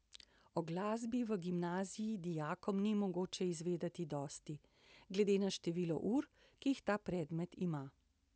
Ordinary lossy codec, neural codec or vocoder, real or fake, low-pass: none; none; real; none